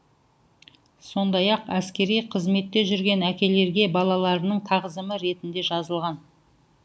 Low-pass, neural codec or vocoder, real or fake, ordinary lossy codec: none; none; real; none